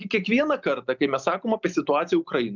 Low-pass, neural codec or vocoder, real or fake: 7.2 kHz; none; real